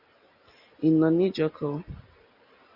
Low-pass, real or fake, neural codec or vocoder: 5.4 kHz; real; none